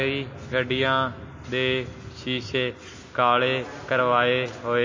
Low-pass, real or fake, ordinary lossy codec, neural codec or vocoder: 7.2 kHz; real; MP3, 32 kbps; none